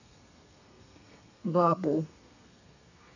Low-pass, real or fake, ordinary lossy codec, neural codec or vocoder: 7.2 kHz; fake; none; codec, 32 kHz, 1.9 kbps, SNAC